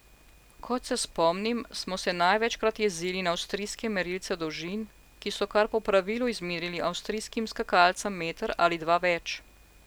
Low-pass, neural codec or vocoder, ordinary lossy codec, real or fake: none; none; none; real